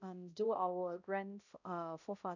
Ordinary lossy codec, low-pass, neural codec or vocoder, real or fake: none; 7.2 kHz; codec, 16 kHz, 0.5 kbps, X-Codec, HuBERT features, trained on balanced general audio; fake